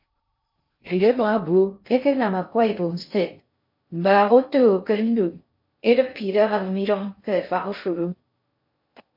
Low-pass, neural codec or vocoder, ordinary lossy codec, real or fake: 5.4 kHz; codec, 16 kHz in and 24 kHz out, 0.6 kbps, FocalCodec, streaming, 2048 codes; MP3, 32 kbps; fake